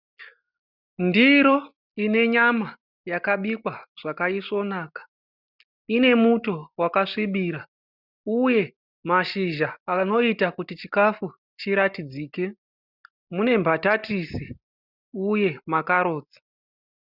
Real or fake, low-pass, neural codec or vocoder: real; 5.4 kHz; none